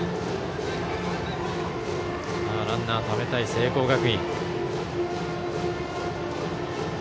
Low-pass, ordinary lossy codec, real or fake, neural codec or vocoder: none; none; real; none